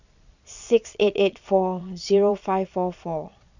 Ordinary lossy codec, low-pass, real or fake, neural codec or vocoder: none; 7.2 kHz; fake; vocoder, 22.05 kHz, 80 mel bands, Vocos